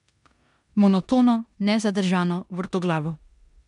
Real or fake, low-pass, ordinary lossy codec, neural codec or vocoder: fake; 10.8 kHz; MP3, 96 kbps; codec, 16 kHz in and 24 kHz out, 0.9 kbps, LongCat-Audio-Codec, four codebook decoder